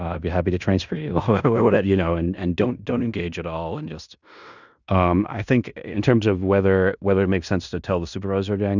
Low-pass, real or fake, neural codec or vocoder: 7.2 kHz; fake; codec, 16 kHz in and 24 kHz out, 0.9 kbps, LongCat-Audio-Codec, fine tuned four codebook decoder